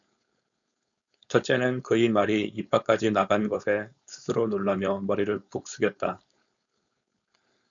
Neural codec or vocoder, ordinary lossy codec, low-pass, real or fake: codec, 16 kHz, 4.8 kbps, FACodec; MP3, 96 kbps; 7.2 kHz; fake